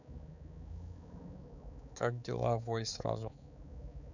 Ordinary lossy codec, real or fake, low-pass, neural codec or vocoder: none; fake; 7.2 kHz; codec, 16 kHz, 4 kbps, X-Codec, HuBERT features, trained on balanced general audio